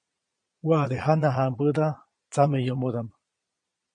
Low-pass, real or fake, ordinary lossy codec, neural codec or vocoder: 9.9 kHz; fake; MP3, 48 kbps; vocoder, 22.05 kHz, 80 mel bands, Vocos